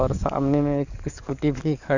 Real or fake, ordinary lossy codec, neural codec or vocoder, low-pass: fake; none; codec, 44.1 kHz, 7.8 kbps, DAC; 7.2 kHz